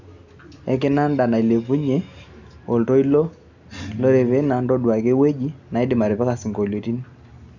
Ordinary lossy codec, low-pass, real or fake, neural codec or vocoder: none; 7.2 kHz; real; none